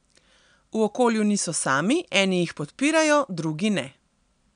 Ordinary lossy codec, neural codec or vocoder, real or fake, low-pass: none; none; real; 9.9 kHz